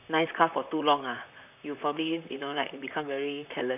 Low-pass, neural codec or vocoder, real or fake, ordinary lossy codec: 3.6 kHz; vocoder, 44.1 kHz, 128 mel bands, Pupu-Vocoder; fake; none